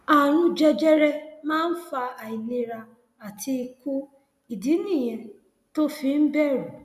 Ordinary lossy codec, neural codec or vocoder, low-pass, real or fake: none; none; 14.4 kHz; real